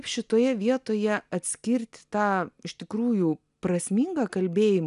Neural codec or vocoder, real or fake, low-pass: none; real; 10.8 kHz